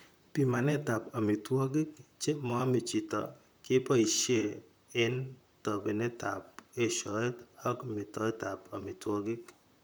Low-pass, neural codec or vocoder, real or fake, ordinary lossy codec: none; vocoder, 44.1 kHz, 128 mel bands, Pupu-Vocoder; fake; none